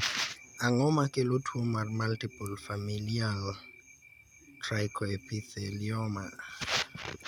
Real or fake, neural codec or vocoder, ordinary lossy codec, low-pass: real; none; none; 19.8 kHz